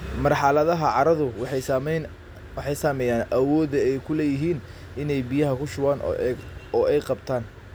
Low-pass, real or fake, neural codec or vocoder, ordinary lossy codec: none; real; none; none